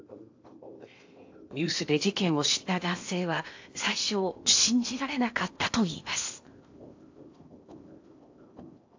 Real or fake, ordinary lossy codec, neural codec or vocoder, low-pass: fake; AAC, 48 kbps; codec, 16 kHz in and 24 kHz out, 0.8 kbps, FocalCodec, streaming, 65536 codes; 7.2 kHz